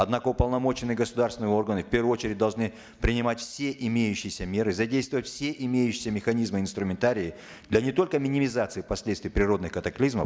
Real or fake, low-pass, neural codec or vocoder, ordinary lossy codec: real; none; none; none